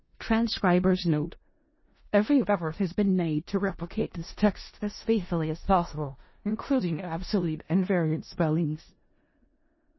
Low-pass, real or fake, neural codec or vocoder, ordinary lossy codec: 7.2 kHz; fake; codec, 16 kHz in and 24 kHz out, 0.4 kbps, LongCat-Audio-Codec, four codebook decoder; MP3, 24 kbps